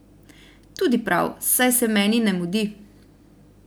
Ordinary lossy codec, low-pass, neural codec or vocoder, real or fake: none; none; none; real